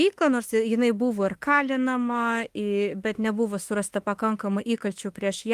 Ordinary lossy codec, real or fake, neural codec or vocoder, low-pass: Opus, 24 kbps; fake; autoencoder, 48 kHz, 32 numbers a frame, DAC-VAE, trained on Japanese speech; 14.4 kHz